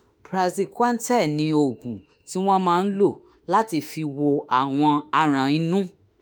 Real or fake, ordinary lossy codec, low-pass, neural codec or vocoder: fake; none; none; autoencoder, 48 kHz, 32 numbers a frame, DAC-VAE, trained on Japanese speech